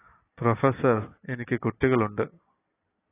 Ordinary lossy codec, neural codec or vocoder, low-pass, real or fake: AAC, 16 kbps; none; 3.6 kHz; real